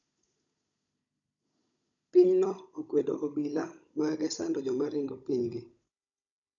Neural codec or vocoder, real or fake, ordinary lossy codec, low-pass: codec, 16 kHz, 16 kbps, FunCodec, trained on Chinese and English, 50 frames a second; fake; none; 7.2 kHz